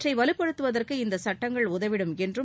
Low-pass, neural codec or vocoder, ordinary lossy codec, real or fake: none; none; none; real